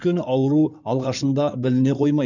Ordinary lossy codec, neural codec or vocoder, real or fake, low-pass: none; codec, 16 kHz in and 24 kHz out, 2.2 kbps, FireRedTTS-2 codec; fake; 7.2 kHz